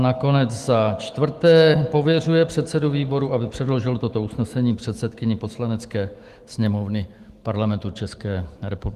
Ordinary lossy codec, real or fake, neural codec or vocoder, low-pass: Opus, 24 kbps; fake; autoencoder, 48 kHz, 128 numbers a frame, DAC-VAE, trained on Japanese speech; 14.4 kHz